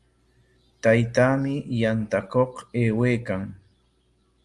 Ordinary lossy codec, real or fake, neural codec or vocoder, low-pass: Opus, 32 kbps; real; none; 10.8 kHz